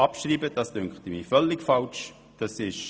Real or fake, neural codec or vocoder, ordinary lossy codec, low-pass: real; none; none; none